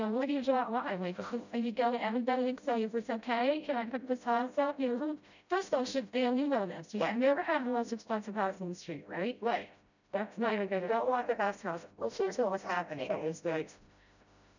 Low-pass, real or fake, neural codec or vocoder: 7.2 kHz; fake; codec, 16 kHz, 0.5 kbps, FreqCodec, smaller model